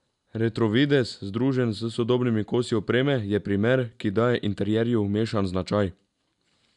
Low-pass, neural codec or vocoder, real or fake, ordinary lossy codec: 10.8 kHz; none; real; none